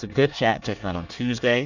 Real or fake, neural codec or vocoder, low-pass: fake; codec, 24 kHz, 1 kbps, SNAC; 7.2 kHz